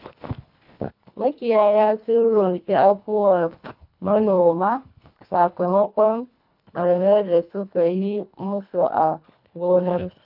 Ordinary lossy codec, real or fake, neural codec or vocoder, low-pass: none; fake; codec, 24 kHz, 1.5 kbps, HILCodec; 5.4 kHz